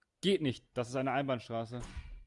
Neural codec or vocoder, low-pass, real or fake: none; 10.8 kHz; real